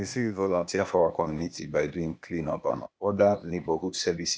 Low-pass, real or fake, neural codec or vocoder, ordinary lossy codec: none; fake; codec, 16 kHz, 0.8 kbps, ZipCodec; none